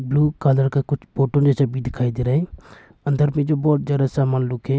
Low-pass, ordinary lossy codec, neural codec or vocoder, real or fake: none; none; none; real